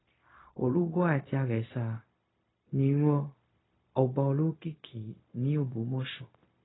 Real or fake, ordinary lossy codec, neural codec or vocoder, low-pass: fake; AAC, 16 kbps; codec, 16 kHz, 0.4 kbps, LongCat-Audio-Codec; 7.2 kHz